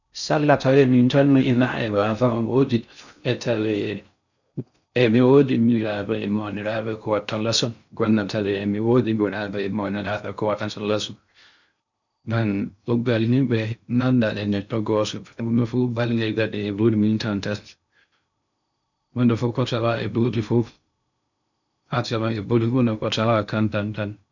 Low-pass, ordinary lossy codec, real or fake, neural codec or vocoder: 7.2 kHz; none; fake; codec, 16 kHz in and 24 kHz out, 0.6 kbps, FocalCodec, streaming, 4096 codes